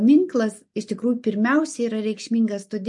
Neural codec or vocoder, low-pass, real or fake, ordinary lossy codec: none; 10.8 kHz; real; MP3, 48 kbps